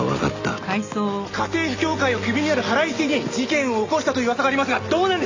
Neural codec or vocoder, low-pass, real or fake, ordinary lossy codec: none; 7.2 kHz; real; AAC, 48 kbps